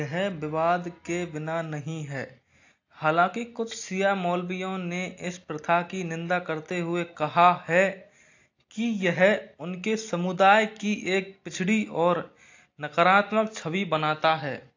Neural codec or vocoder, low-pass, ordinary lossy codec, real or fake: none; 7.2 kHz; AAC, 48 kbps; real